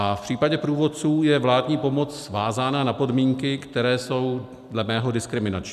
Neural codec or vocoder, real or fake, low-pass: none; real; 14.4 kHz